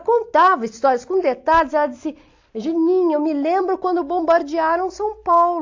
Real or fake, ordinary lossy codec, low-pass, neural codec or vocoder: real; AAC, 48 kbps; 7.2 kHz; none